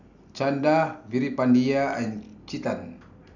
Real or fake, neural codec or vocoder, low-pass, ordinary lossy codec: real; none; 7.2 kHz; none